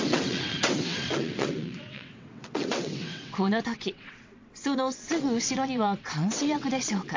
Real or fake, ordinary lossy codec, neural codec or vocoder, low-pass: fake; MP3, 48 kbps; vocoder, 22.05 kHz, 80 mel bands, WaveNeXt; 7.2 kHz